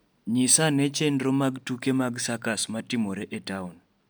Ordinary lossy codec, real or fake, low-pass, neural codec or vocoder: none; real; none; none